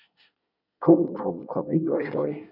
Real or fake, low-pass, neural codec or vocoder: fake; 5.4 kHz; codec, 24 kHz, 1 kbps, SNAC